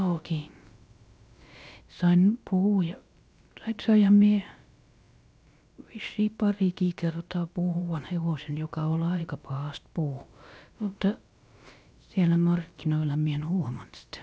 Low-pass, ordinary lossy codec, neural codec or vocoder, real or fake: none; none; codec, 16 kHz, about 1 kbps, DyCAST, with the encoder's durations; fake